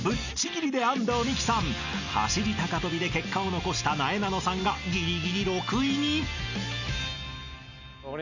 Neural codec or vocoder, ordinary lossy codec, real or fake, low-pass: none; none; real; 7.2 kHz